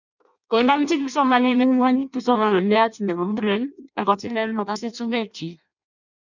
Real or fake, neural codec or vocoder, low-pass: fake; codec, 16 kHz in and 24 kHz out, 0.6 kbps, FireRedTTS-2 codec; 7.2 kHz